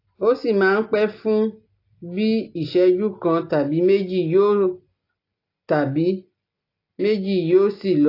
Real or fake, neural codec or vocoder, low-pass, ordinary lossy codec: real; none; 5.4 kHz; AAC, 32 kbps